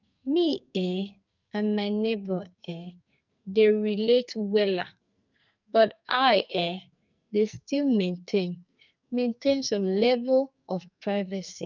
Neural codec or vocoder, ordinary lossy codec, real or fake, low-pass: codec, 44.1 kHz, 2.6 kbps, SNAC; none; fake; 7.2 kHz